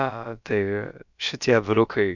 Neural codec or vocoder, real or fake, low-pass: codec, 16 kHz, about 1 kbps, DyCAST, with the encoder's durations; fake; 7.2 kHz